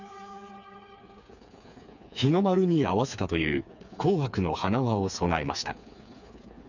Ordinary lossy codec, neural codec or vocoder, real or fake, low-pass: none; codec, 16 kHz, 4 kbps, FreqCodec, smaller model; fake; 7.2 kHz